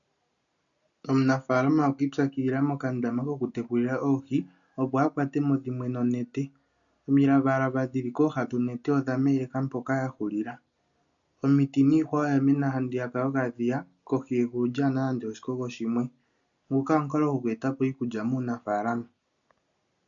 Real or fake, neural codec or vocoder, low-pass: real; none; 7.2 kHz